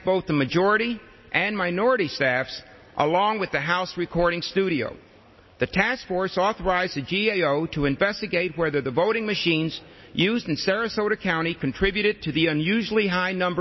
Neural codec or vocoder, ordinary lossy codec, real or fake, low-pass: none; MP3, 24 kbps; real; 7.2 kHz